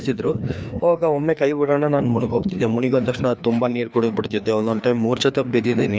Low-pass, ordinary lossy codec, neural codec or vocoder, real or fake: none; none; codec, 16 kHz, 2 kbps, FreqCodec, larger model; fake